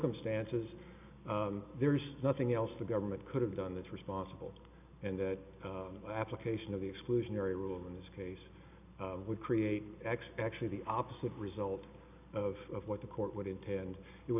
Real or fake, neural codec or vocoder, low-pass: real; none; 3.6 kHz